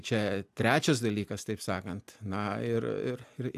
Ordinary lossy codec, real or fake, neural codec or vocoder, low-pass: AAC, 96 kbps; real; none; 14.4 kHz